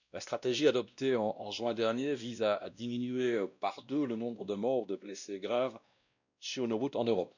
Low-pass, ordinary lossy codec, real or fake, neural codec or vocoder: 7.2 kHz; none; fake; codec, 16 kHz, 1 kbps, X-Codec, WavLM features, trained on Multilingual LibriSpeech